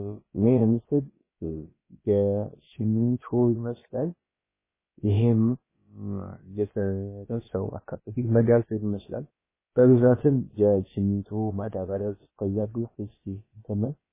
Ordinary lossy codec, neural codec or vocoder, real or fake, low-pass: MP3, 16 kbps; codec, 16 kHz, about 1 kbps, DyCAST, with the encoder's durations; fake; 3.6 kHz